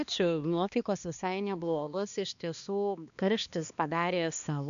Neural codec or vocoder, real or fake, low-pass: codec, 16 kHz, 2 kbps, X-Codec, HuBERT features, trained on balanced general audio; fake; 7.2 kHz